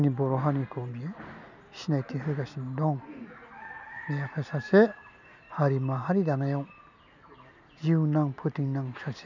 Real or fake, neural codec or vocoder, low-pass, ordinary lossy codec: real; none; 7.2 kHz; none